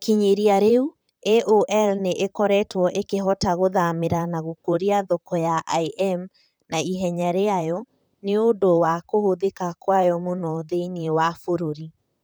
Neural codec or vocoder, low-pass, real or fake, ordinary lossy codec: vocoder, 44.1 kHz, 128 mel bands, Pupu-Vocoder; none; fake; none